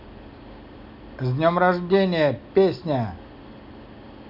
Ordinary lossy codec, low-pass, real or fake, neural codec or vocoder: none; 5.4 kHz; real; none